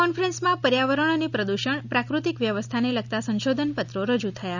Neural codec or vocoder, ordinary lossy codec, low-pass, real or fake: none; none; 7.2 kHz; real